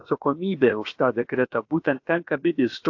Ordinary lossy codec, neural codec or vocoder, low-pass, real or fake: AAC, 48 kbps; codec, 16 kHz, about 1 kbps, DyCAST, with the encoder's durations; 7.2 kHz; fake